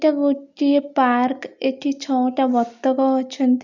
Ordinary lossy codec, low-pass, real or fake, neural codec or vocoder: none; 7.2 kHz; real; none